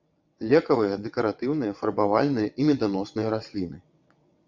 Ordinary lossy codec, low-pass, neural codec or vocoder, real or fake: MP3, 64 kbps; 7.2 kHz; vocoder, 22.05 kHz, 80 mel bands, WaveNeXt; fake